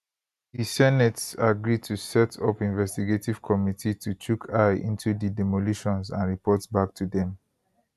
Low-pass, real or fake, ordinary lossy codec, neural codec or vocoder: 14.4 kHz; real; none; none